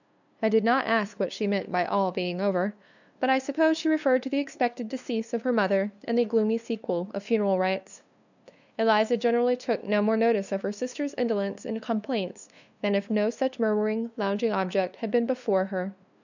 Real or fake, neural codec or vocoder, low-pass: fake; codec, 16 kHz, 2 kbps, FunCodec, trained on LibriTTS, 25 frames a second; 7.2 kHz